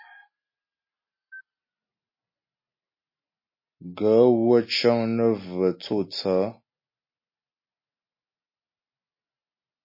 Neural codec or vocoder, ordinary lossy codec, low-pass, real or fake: none; MP3, 24 kbps; 5.4 kHz; real